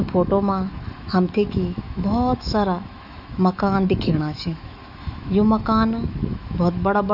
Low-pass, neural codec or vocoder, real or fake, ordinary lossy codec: 5.4 kHz; autoencoder, 48 kHz, 128 numbers a frame, DAC-VAE, trained on Japanese speech; fake; none